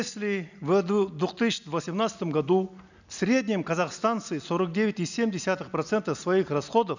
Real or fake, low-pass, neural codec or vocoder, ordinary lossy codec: real; 7.2 kHz; none; none